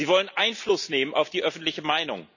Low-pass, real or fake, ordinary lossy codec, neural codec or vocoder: 7.2 kHz; real; none; none